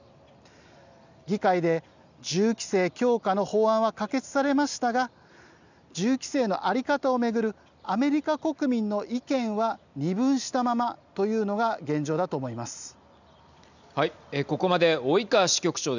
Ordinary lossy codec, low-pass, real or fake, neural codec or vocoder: none; 7.2 kHz; real; none